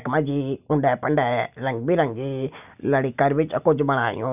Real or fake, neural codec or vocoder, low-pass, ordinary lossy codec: real; none; 3.6 kHz; none